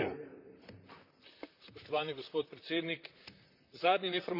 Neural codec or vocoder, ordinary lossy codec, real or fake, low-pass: vocoder, 44.1 kHz, 128 mel bands, Pupu-Vocoder; none; fake; 5.4 kHz